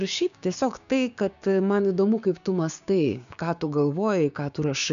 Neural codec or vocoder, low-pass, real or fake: codec, 16 kHz, 6 kbps, DAC; 7.2 kHz; fake